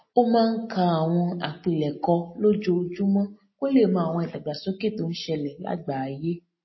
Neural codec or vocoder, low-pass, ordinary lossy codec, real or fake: none; 7.2 kHz; MP3, 24 kbps; real